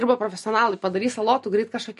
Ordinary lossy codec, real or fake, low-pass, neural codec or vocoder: MP3, 48 kbps; real; 14.4 kHz; none